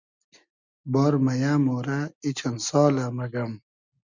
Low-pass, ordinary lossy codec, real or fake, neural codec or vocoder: 7.2 kHz; Opus, 64 kbps; real; none